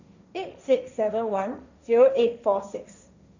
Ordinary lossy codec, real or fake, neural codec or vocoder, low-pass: none; fake; codec, 16 kHz, 1.1 kbps, Voila-Tokenizer; 7.2 kHz